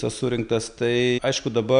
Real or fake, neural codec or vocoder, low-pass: real; none; 9.9 kHz